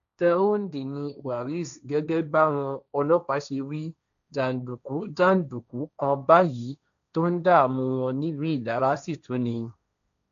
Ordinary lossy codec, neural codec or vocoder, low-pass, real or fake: none; codec, 16 kHz, 1.1 kbps, Voila-Tokenizer; 7.2 kHz; fake